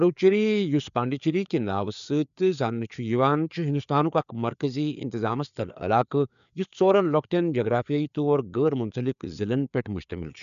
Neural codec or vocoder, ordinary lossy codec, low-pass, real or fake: codec, 16 kHz, 4 kbps, FreqCodec, larger model; none; 7.2 kHz; fake